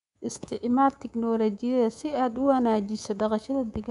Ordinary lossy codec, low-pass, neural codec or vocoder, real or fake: none; 10.8 kHz; codec, 24 kHz, 3.1 kbps, DualCodec; fake